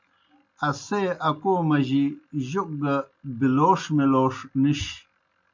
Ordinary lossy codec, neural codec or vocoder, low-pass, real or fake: MP3, 64 kbps; none; 7.2 kHz; real